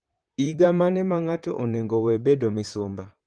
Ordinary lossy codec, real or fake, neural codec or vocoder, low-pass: Opus, 24 kbps; fake; vocoder, 44.1 kHz, 128 mel bands, Pupu-Vocoder; 9.9 kHz